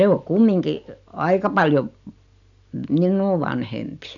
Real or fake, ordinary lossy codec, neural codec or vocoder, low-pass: real; none; none; 7.2 kHz